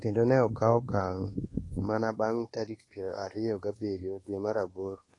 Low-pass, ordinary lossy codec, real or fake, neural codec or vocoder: 10.8 kHz; AAC, 32 kbps; fake; codec, 24 kHz, 1.2 kbps, DualCodec